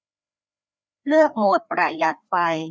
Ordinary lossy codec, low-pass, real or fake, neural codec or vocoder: none; none; fake; codec, 16 kHz, 2 kbps, FreqCodec, larger model